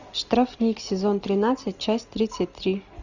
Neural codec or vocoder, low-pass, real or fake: none; 7.2 kHz; real